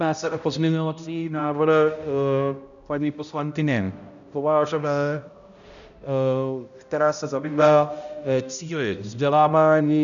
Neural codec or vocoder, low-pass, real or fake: codec, 16 kHz, 0.5 kbps, X-Codec, HuBERT features, trained on balanced general audio; 7.2 kHz; fake